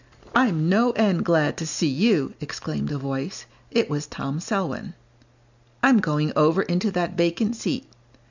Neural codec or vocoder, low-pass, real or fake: none; 7.2 kHz; real